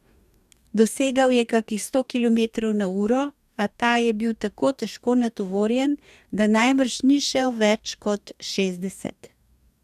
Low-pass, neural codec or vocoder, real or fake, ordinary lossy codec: 14.4 kHz; codec, 44.1 kHz, 2.6 kbps, DAC; fake; none